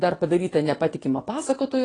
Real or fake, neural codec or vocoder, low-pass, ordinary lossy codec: fake; vocoder, 22.05 kHz, 80 mel bands, WaveNeXt; 9.9 kHz; AAC, 32 kbps